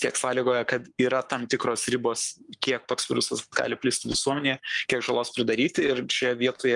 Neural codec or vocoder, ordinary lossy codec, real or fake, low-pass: codec, 44.1 kHz, 7.8 kbps, Pupu-Codec; Opus, 32 kbps; fake; 10.8 kHz